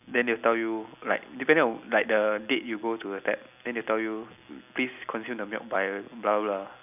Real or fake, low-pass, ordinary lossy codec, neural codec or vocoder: real; 3.6 kHz; none; none